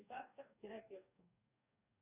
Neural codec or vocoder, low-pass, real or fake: codec, 44.1 kHz, 2.6 kbps, DAC; 3.6 kHz; fake